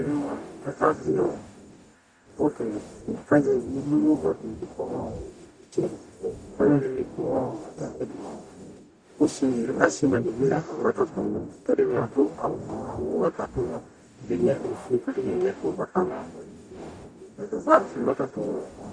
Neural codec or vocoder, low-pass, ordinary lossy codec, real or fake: codec, 44.1 kHz, 0.9 kbps, DAC; 9.9 kHz; Opus, 64 kbps; fake